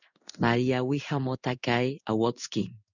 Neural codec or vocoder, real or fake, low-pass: codec, 16 kHz in and 24 kHz out, 1 kbps, XY-Tokenizer; fake; 7.2 kHz